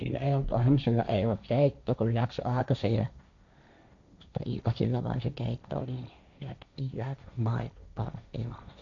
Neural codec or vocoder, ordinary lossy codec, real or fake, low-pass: codec, 16 kHz, 1.1 kbps, Voila-Tokenizer; none; fake; 7.2 kHz